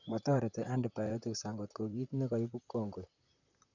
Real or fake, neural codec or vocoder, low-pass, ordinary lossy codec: fake; vocoder, 44.1 kHz, 128 mel bands, Pupu-Vocoder; 7.2 kHz; none